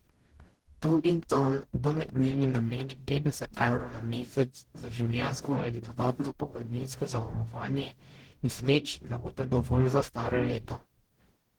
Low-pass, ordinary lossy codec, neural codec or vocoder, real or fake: 19.8 kHz; Opus, 16 kbps; codec, 44.1 kHz, 0.9 kbps, DAC; fake